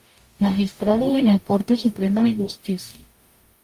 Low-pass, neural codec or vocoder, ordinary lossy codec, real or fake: 14.4 kHz; codec, 44.1 kHz, 0.9 kbps, DAC; Opus, 32 kbps; fake